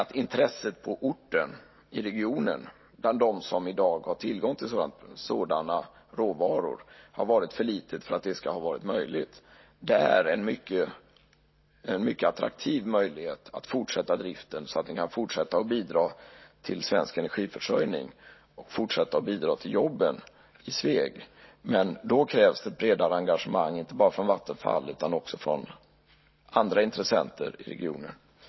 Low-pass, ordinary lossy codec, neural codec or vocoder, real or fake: 7.2 kHz; MP3, 24 kbps; none; real